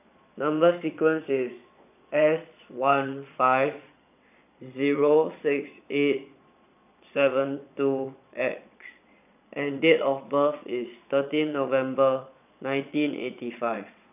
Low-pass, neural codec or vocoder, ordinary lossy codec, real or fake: 3.6 kHz; vocoder, 22.05 kHz, 80 mel bands, Vocos; none; fake